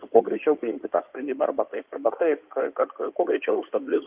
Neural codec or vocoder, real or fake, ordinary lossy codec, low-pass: codec, 16 kHz in and 24 kHz out, 2.2 kbps, FireRedTTS-2 codec; fake; Opus, 24 kbps; 3.6 kHz